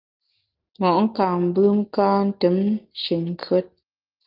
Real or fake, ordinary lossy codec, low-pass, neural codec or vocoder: real; Opus, 32 kbps; 5.4 kHz; none